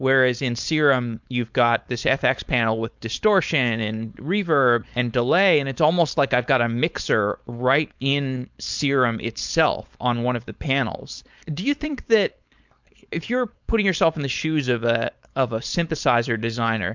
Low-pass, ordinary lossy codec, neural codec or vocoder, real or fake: 7.2 kHz; MP3, 64 kbps; codec, 16 kHz, 4.8 kbps, FACodec; fake